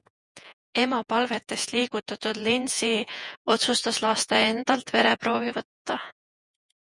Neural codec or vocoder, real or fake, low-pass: vocoder, 48 kHz, 128 mel bands, Vocos; fake; 10.8 kHz